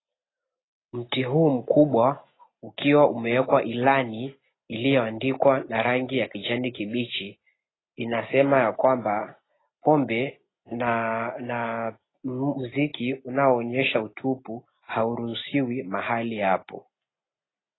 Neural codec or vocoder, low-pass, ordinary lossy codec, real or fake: none; 7.2 kHz; AAC, 16 kbps; real